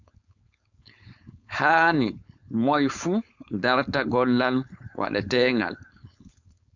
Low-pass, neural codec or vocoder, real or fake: 7.2 kHz; codec, 16 kHz, 4.8 kbps, FACodec; fake